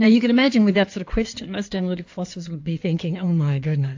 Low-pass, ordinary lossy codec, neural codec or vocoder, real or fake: 7.2 kHz; AAC, 48 kbps; codec, 16 kHz in and 24 kHz out, 2.2 kbps, FireRedTTS-2 codec; fake